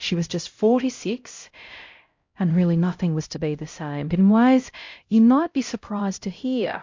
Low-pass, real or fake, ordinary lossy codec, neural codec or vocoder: 7.2 kHz; fake; MP3, 48 kbps; codec, 16 kHz, 0.5 kbps, X-Codec, HuBERT features, trained on LibriSpeech